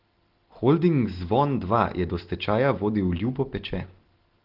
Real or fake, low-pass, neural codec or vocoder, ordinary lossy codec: real; 5.4 kHz; none; Opus, 16 kbps